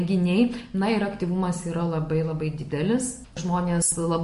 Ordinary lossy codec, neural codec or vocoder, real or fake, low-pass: MP3, 48 kbps; none; real; 14.4 kHz